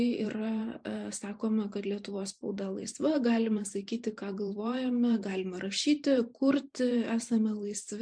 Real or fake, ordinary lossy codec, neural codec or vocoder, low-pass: fake; MP3, 64 kbps; vocoder, 44.1 kHz, 128 mel bands every 512 samples, BigVGAN v2; 9.9 kHz